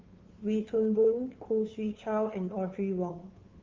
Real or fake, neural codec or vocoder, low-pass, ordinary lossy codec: fake; codec, 16 kHz, 2 kbps, FunCodec, trained on Chinese and English, 25 frames a second; 7.2 kHz; Opus, 32 kbps